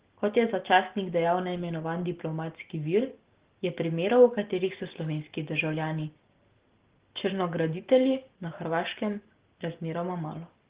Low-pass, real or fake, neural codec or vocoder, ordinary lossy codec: 3.6 kHz; real; none; Opus, 16 kbps